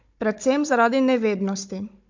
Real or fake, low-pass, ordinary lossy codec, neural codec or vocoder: fake; 7.2 kHz; MP3, 48 kbps; codec, 16 kHz, 16 kbps, FunCodec, trained on Chinese and English, 50 frames a second